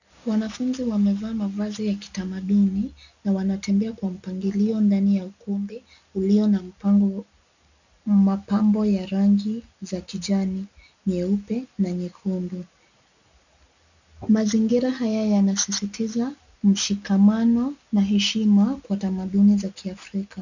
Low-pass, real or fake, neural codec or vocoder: 7.2 kHz; real; none